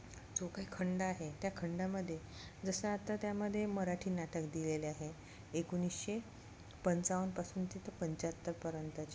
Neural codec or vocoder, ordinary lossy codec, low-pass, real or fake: none; none; none; real